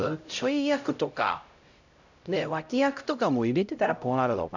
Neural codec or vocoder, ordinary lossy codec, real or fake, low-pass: codec, 16 kHz, 0.5 kbps, X-Codec, HuBERT features, trained on LibriSpeech; none; fake; 7.2 kHz